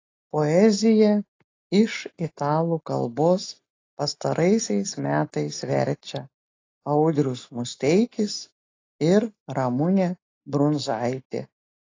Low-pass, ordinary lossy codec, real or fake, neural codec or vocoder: 7.2 kHz; AAC, 32 kbps; real; none